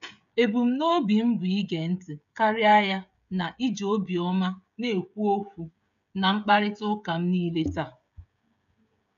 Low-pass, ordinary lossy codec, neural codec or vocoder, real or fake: 7.2 kHz; none; codec, 16 kHz, 16 kbps, FreqCodec, smaller model; fake